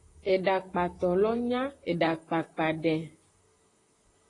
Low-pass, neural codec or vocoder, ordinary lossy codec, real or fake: 10.8 kHz; vocoder, 44.1 kHz, 128 mel bands, Pupu-Vocoder; AAC, 32 kbps; fake